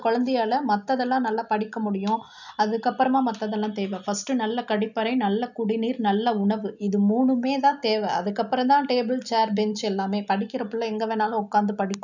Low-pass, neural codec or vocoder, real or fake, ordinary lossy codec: 7.2 kHz; none; real; none